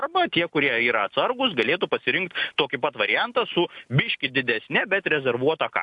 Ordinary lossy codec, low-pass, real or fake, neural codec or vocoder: AAC, 64 kbps; 10.8 kHz; real; none